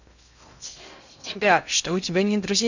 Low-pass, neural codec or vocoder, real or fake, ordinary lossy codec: 7.2 kHz; codec, 16 kHz in and 24 kHz out, 0.6 kbps, FocalCodec, streaming, 2048 codes; fake; none